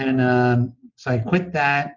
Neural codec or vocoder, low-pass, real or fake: none; 7.2 kHz; real